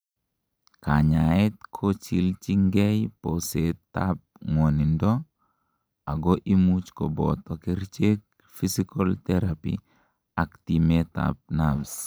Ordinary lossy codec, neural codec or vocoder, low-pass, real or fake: none; none; none; real